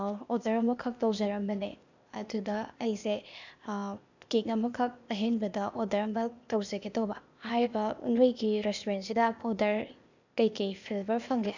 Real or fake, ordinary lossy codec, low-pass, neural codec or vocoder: fake; none; 7.2 kHz; codec, 16 kHz, 0.8 kbps, ZipCodec